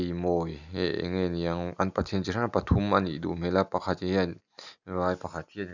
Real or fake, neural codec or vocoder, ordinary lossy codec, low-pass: real; none; none; 7.2 kHz